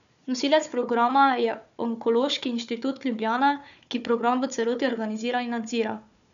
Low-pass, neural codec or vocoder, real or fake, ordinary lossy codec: 7.2 kHz; codec, 16 kHz, 4 kbps, FunCodec, trained on Chinese and English, 50 frames a second; fake; none